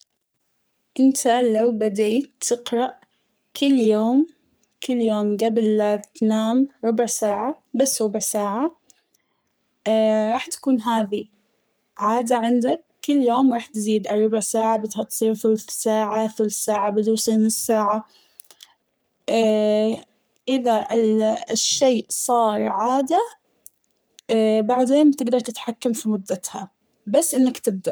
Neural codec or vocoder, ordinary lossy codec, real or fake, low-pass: codec, 44.1 kHz, 3.4 kbps, Pupu-Codec; none; fake; none